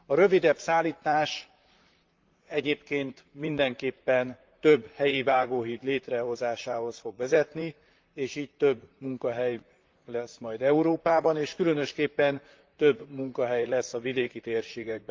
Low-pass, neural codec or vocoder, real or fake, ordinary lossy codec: 7.2 kHz; vocoder, 44.1 kHz, 128 mel bands, Pupu-Vocoder; fake; Opus, 32 kbps